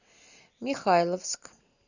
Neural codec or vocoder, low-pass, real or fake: none; 7.2 kHz; real